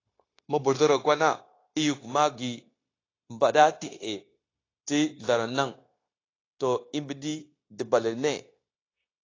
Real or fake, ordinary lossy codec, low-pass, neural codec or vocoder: fake; AAC, 32 kbps; 7.2 kHz; codec, 16 kHz, 0.9 kbps, LongCat-Audio-Codec